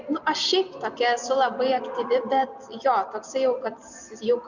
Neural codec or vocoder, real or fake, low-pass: none; real; 7.2 kHz